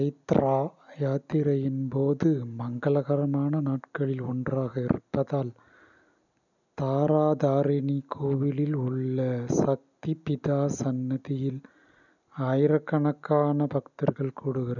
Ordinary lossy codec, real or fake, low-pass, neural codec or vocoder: none; real; 7.2 kHz; none